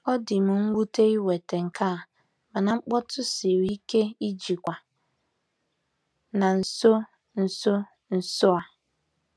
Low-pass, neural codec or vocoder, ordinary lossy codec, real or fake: none; none; none; real